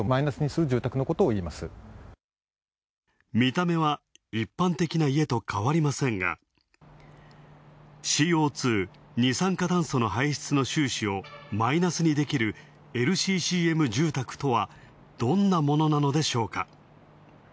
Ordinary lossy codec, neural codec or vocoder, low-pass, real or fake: none; none; none; real